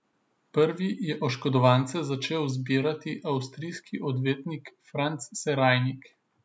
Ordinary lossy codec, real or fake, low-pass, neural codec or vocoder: none; real; none; none